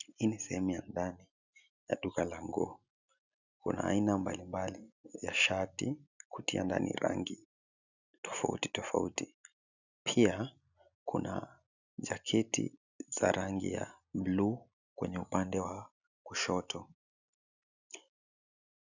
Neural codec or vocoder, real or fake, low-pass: none; real; 7.2 kHz